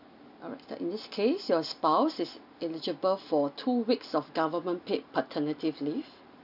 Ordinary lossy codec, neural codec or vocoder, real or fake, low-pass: none; none; real; 5.4 kHz